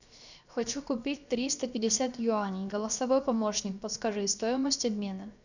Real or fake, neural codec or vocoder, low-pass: fake; codec, 16 kHz, 0.7 kbps, FocalCodec; 7.2 kHz